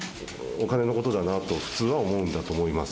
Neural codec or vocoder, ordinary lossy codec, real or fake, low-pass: none; none; real; none